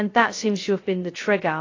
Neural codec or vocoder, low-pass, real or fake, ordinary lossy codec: codec, 16 kHz, 0.2 kbps, FocalCodec; 7.2 kHz; fake; AAC, 32 kbps